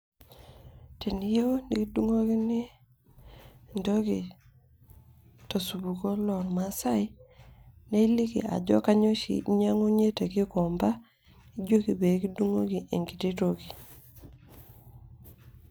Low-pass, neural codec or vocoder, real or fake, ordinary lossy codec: none; none; real; none